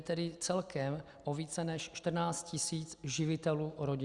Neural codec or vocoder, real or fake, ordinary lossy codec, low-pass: none; real; Opus, 64 kbps; 10.8 kHz